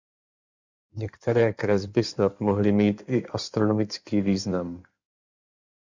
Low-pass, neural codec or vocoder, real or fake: 7.2 kHz; codec, 16 kHz in and 24 kHz out, 2.2 kbps, FireRedTTS-2 codec; fake